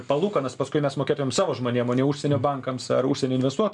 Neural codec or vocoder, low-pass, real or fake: none; 10.8 kHz; real